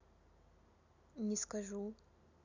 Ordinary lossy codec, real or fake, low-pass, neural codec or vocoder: none; real; 7.2 kHz; none